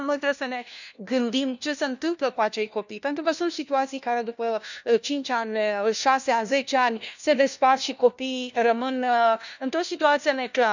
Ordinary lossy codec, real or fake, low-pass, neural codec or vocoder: none; fake; 7.2 kHz; codec, 16 kHz, 1 kbps, FunCodec, trained on LibriTTS, 50 frames a second